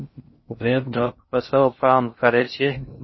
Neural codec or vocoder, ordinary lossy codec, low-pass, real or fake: codec, 16 kHz in and 24 kHz out, 0.6 kbps, FocalCodec, streaming, 2048 codes; MP3, 24 kbps; 7.2 kHz; fake